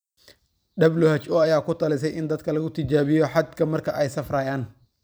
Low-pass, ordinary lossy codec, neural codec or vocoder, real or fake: none; none; vocoder, 44.1 kHz, 128 mel bands every 256 samples, BigVGAN v2; fake